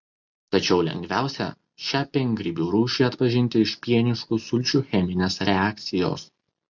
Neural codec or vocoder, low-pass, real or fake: none; 7.2 kHz; real